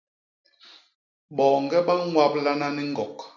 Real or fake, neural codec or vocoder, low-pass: real; none; 7.2 kHz